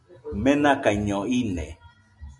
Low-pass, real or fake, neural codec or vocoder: 10.8 kHz; real; none